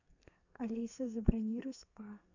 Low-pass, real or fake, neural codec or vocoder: 7.2 kHz; fake; codec, 32 kHz, 1.9 kbps, SNAC